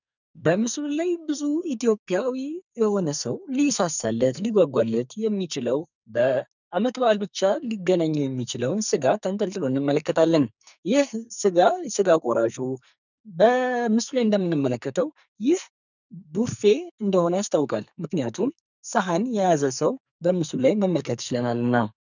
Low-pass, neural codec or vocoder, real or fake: 7.2 kHz; codec, 44.1 kHz, 2.6 kbps, SNAC; fake